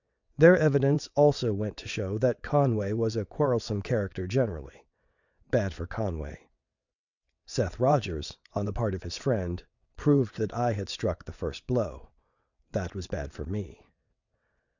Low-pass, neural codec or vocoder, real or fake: 7.2 kHz; vocoder, 22.05 kHz, 80 mel bands, WaveNeXt; fake